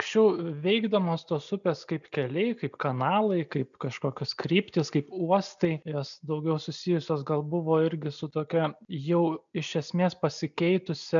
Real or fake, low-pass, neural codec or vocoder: real; 7.2 kHz; none